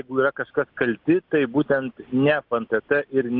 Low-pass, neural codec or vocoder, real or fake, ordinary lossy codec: 5.4 kHz; none; real; Opus, 24 kbps